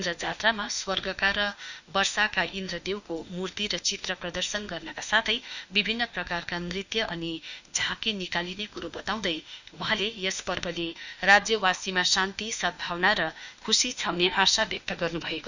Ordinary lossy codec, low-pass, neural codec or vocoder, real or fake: none; 7.2 kHz; autoencoder, 48 kHz, 32 numbers a frame, DAC-VAE, trained on Japanese speech; fake